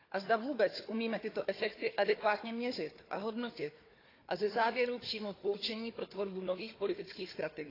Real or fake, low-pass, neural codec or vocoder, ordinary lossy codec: fake; 5.4 kHz; codec, 16 kHz, 4 kbps, FunCodec, trained on Chinese and English, 50 frames a second; AAC, 24 kbps